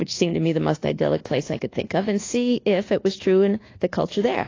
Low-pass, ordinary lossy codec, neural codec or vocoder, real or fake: 7.2 kHz; AAC, 32 kbps; codec, 24 kHz, 1.2 kbps, DualCodec; fake